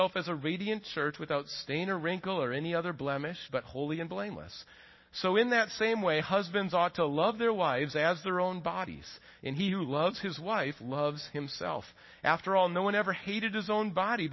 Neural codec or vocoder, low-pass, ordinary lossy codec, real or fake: none; 7.2 kHz; MP3, 24 kbps; real